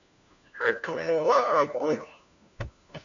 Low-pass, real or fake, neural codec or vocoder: 7.2 kHz; fake; codec, 16 kHz, 1 kbps, FunCodec, trained on LibriTTS, 50 frames a second